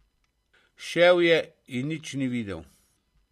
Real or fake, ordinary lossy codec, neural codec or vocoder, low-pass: real; MP3, 64 kbps; none; 14.4 kHz